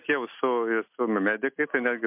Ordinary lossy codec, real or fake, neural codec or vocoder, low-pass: MP3, 32 kbps; real; none; 3.6 kHz